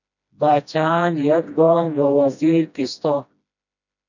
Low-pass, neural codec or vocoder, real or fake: 7.2 kHz; codec, 16 kHz, 1 kbps, FreqCodec, smaller model; fake